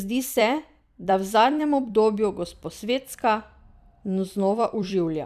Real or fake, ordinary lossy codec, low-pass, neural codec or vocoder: fake; none; 14.4 kHz; vocoder, 44.1 kHz, 128 mel bands every 256 samples, BigVGAN v2